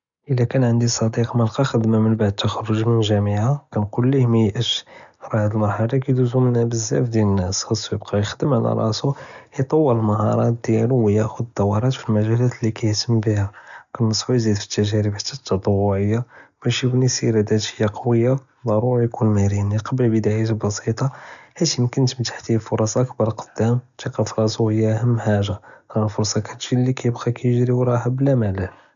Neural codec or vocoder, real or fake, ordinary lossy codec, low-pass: none; real; none; 7.2 kHz